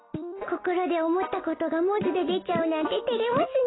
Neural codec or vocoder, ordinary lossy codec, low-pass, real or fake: none; AAC, 16 kbps; 7.2 kHz; real